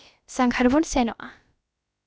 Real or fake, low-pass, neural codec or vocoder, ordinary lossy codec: fake; none; codec, 16 kHz, about 1 kbps, DyCAST, with the encoder's durations; none